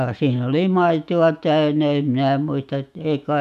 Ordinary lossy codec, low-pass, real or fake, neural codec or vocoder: none; 14.4 kHz; fake; autoencoder, 48 kHz, 128 numbers a frame, DAC-VAE, trained on Japanese speech